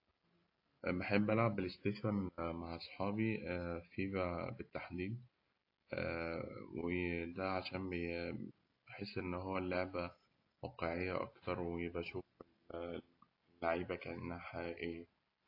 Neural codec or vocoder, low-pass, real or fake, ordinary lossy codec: none; 5.4 kHz; real; AAC, 32 kbps